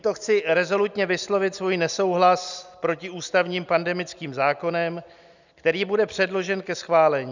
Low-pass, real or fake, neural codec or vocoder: 7.2 kHz; real; none